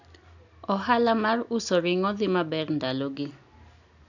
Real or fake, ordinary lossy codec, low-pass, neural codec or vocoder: real; none; 7.2 kHz; none